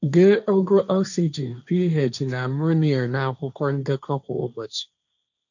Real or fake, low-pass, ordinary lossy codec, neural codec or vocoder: fake; 7.2 kHz; none; codec, 16 kHz, 1.1 kbps, Voila-Tokenizer